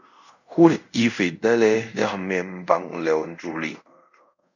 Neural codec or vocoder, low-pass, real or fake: codec, 24 kHz, 0.5 kbps, DualCodec; 7.2 kHz; fake